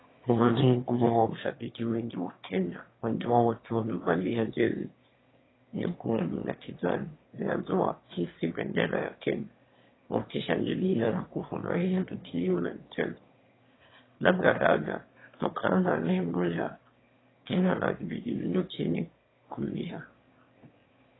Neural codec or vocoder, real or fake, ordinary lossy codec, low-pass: autoencoder, 22.05 kHz, a latent of 192 numbers a frame, VITS, trained on one speaker; fake; AAC, 16 kbps; 7.2 kHz